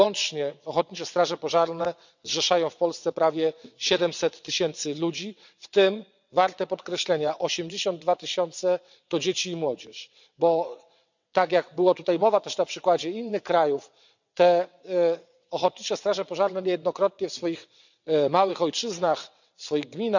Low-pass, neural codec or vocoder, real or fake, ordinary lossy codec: 7.2 kHz; vocoder, 22.05 kHz, 80 mel bands, WaveNeXt; fake; none